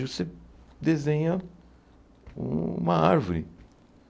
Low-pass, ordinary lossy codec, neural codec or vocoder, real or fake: none; none; none; real